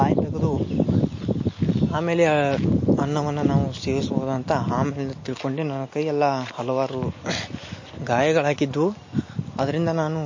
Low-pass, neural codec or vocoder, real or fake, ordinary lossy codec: 7.2 kHz; none; real; MP3, 32 kbps